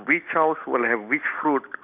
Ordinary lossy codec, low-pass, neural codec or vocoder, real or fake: none; 3.6 kHz; none; real